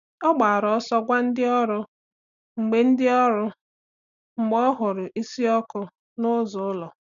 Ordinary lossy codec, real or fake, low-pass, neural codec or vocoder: none; real; 7.2 kHz; none